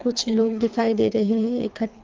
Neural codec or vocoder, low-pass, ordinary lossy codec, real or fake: codec, 24 kHz, 3 kbps, HILCodec; 7.2 kHz; Opus, 24 kbps; fake